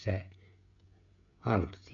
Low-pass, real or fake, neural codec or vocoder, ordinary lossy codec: 7.2 kHz; fake; codec, 16 kHz, 16 kbps, FreqCodec, smaller model; AAC, 64 kbps